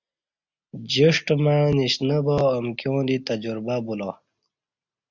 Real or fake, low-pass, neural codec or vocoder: real; 7.2 kHz; none